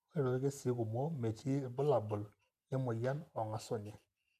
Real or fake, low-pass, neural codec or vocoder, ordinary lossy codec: real; 14.4 kHz; none; none